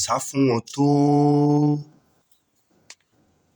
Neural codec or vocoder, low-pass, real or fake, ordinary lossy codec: vocoder, 48 kHz, 128 mel bands, Vocos; none; fake; none